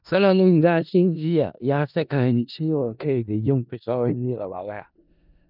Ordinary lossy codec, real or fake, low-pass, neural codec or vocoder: none; fake; 5.4 kHz; codec, 16 kHz in and 24 kHz out, 0.4 kbps, LongCat-Audio-Codec, four codebook decoder